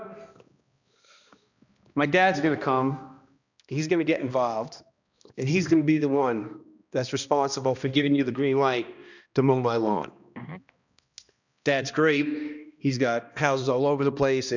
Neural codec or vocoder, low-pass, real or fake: codec, 16 kHz, 1 kbps, X-Codec, HuBERT features, trained on balanced general audio; 7.2 kHz; fake